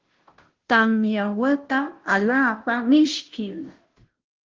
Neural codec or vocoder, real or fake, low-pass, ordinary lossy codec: codec, 16 kHz, 0.5 kbps, FunCodec, trained on Chinese and English, 25 frames a second; fake; 7.2 kHz; Opus, 16 kbps